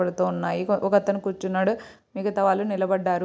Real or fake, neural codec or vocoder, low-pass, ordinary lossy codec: real; none; none; none